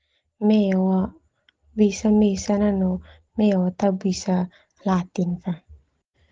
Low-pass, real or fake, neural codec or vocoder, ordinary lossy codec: 7.2 kHz; real; none; Opus, 16 kbps